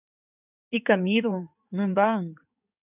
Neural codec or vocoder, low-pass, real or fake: codec, 16 kHz in and 24 kHz out, 2.2 kbps, FireRedTTS-2 codec; 3.6 kHz; fake